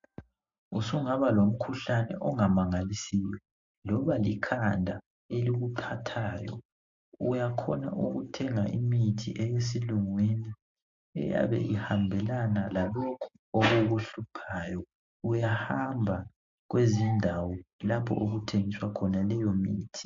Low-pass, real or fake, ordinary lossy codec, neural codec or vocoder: 7.2 kHz; real; MP3, 48 kbps; none